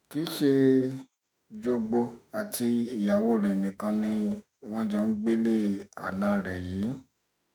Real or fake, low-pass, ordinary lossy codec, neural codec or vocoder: fake; none; none; autoencoder, 48 kHz, 32 numbers a frame, DAC-VAE, trained on Japanese speech